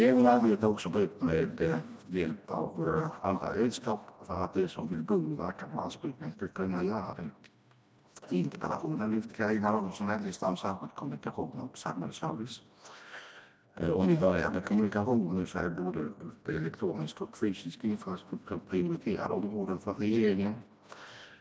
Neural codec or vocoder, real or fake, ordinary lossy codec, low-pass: codec, 16 kHz, 1 kbps, FreqCodec, smaller model; fake; none; none